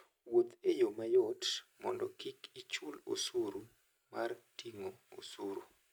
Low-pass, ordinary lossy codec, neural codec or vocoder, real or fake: none; none; none; real